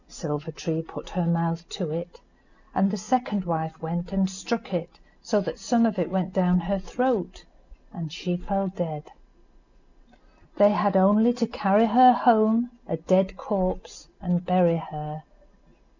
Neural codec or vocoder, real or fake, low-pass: none; real; 7.2 kHz